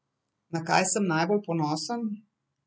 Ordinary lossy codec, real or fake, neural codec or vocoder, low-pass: none; real; none; none